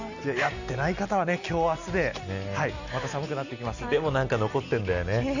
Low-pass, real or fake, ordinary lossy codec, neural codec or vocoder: 7.2 kHz; real; none; none